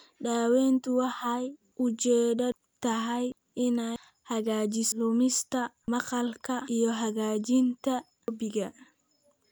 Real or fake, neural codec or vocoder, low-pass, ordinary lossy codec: real; none; none; none